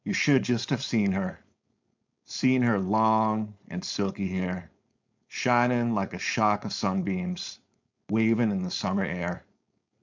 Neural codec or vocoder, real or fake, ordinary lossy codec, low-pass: codec, 16 kHz, 4.8 kbps, FACodec; fake; MP3, 64 kbps; 7.2 kHz